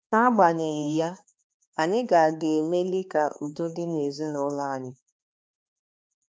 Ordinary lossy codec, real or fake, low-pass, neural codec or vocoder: none; fake; none; codec, 16 kHz, 2 kbps, X-Codec, HuBERT features, trained on balanced general audio